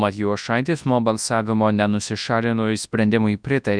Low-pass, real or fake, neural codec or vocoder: 9.9 kHz; fake; codec, 24 kHz, 0.9 kbps, WavTokenizer, large speech release